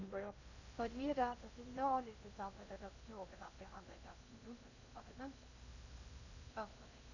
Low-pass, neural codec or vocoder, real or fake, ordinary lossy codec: 7.2 kHz; codec, 16 kHz in and 24 kHz out, 0.6 kbps, FocalCodec, streaming, 2048 codes; fake; none